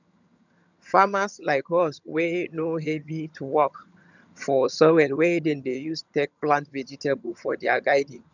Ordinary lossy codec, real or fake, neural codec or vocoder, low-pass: none; fake; vocoder, 22.05 kHz, 80 mel bands, HiFi-GAN; 7.2 kHz